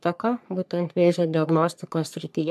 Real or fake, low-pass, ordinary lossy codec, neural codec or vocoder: fake; 14.4 kHz; MP3, 96 kbps; codec, 44.1 kHz, 3.4 kbps, Pupu-Codec